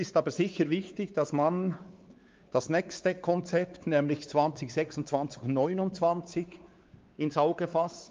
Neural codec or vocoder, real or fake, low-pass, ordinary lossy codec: codec, 16 kHz, 4 kbps, X-Codec, WavLM features, trained on Multilingual LibriSpeech; fake; 7.2 kHz; Opus, 32 kbps